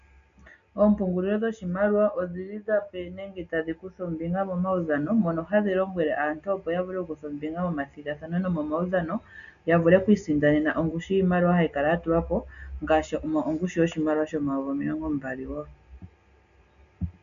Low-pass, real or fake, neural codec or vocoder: 7.2 kHz; real; none